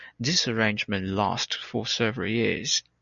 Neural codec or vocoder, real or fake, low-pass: none; real; 7.2 kHz